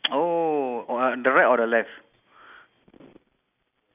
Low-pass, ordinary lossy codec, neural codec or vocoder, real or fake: 3.6 kHz; none; none; real